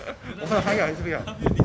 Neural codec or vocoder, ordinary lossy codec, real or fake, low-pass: none; none; real; none